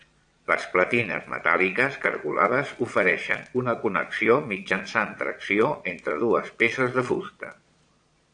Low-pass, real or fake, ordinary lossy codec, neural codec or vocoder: 9.9 kHz; fake; AAC, 64 kbps; vocoder, 22.05 kHz, 80 mel bands, Vocos